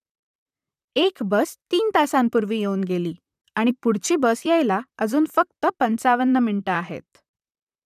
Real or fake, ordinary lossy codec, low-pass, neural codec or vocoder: fake; none; 14.4 kHz; vocoder, 44.1 kHz, 128 mel bands, Pupu-Vocoder